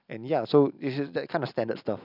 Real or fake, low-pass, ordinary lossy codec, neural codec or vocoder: real; 5.4 kHz; none; none